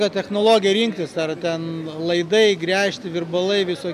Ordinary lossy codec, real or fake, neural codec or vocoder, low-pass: AAC, 96 kbps; real; none; 14.4 kHz